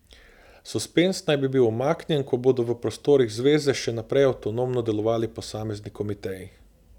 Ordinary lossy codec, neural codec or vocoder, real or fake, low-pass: none; none; real; 19.8 kHz